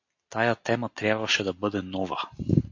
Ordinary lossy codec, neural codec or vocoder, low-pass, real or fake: AAC, 48 kbps; none; 7.2 kHz; real